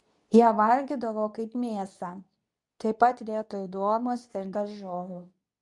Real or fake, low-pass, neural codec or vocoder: fake; 10.8 kHz; codec, 24 kHz, 0.9 kbps, WavTokenizer, medium speech release version 2